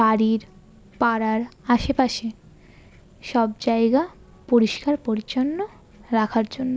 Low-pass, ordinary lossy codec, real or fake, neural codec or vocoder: none; none; real; none